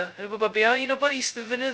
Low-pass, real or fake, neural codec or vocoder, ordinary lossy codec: none; fake; codec, 16 kHz, 0.2 kbps, FocalCodec; none